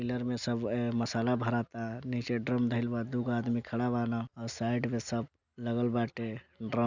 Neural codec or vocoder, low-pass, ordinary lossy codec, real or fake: none; 7.2 kHz; none; real